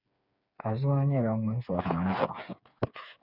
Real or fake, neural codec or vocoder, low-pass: fake; codec, 16 kHz, 4 kbps, FreqCodec, smaller model; 5.4 kHz